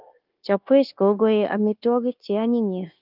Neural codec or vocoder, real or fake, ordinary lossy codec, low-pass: codec, 16 kHz, 0.9 kbps, LongCat-Audio-Codec; fake; Opus, 32 kbps; 5.4 kHz